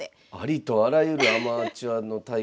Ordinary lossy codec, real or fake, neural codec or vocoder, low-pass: none; real; none; none